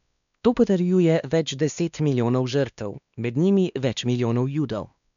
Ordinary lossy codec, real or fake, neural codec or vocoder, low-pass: none; fake; codec, 16 kHz, 2 kbps, X-Codec, WavLM features, trained on Multilingual LibriSpeech; 7.2 kHz